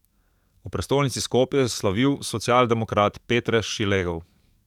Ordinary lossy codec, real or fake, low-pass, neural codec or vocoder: none; fake; 19.8 kHz; codec, 44.1 kHz, 7.8 kbps, DAC